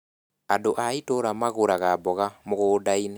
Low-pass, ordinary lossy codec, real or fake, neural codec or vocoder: none; none; real; none